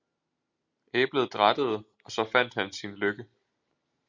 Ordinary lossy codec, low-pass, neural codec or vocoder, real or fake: Opus, 64 kbps; 7.2 kHz; none; real